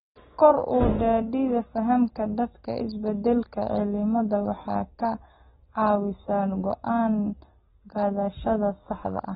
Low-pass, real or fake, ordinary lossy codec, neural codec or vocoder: 7.2 kHz; real; AAC, 16 kbps; none